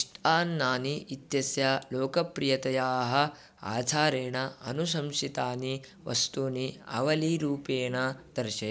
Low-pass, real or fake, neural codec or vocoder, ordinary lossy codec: none; real; none; none